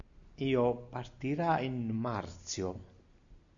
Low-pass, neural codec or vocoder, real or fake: 7.2 kHz; none; real